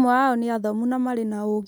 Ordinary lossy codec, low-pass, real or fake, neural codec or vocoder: none; none; real; none